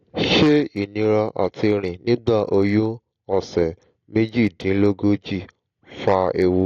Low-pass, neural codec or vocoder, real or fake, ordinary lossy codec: 7.2 kHz; none; real; AAC, 48 kbps